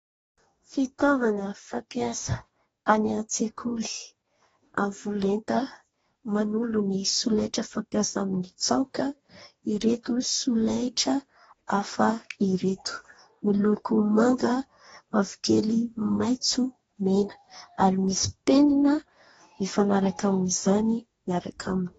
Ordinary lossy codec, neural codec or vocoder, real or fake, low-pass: AAC, 24 kbps; codec, 44.1 kHz, 2.6 kbps, DAC; fake; 19.8 kHz